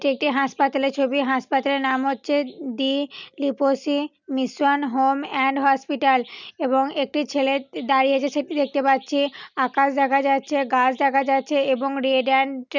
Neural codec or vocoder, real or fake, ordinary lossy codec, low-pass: none; real; none; 7.2 kHz